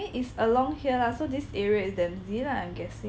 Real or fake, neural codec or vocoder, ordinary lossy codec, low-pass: real; none; none; none